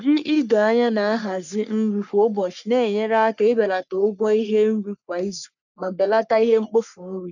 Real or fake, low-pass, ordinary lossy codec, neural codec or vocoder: fake; 7.2 kHz; none; codec, 44.1 kHz, 3.4 kbps, Pupu-Codec